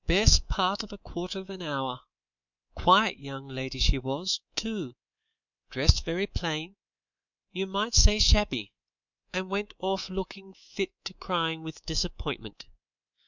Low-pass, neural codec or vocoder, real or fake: 7.2 kHz; codec, 24 kHz, 3.1 kbps, DualCodec; fake